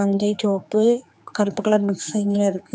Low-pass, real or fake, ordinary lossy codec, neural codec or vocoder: none; fake; none; codec, 16 kHz, 4 kbps, X-Codec, HuBERT features, trained on general audio